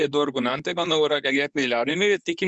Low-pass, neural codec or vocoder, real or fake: 10.8 kHz; codec, 24 kHz, 0.9 kbps, WavTokenizer, medium speech release version 2; fake